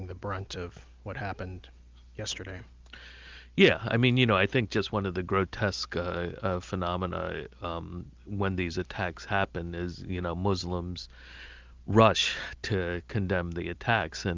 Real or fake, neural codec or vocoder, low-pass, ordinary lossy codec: real; none; 7.2 kHz; Opus, 24 kbps